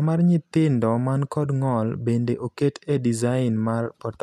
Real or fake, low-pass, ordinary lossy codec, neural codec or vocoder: real; 14.4 kHz; none; none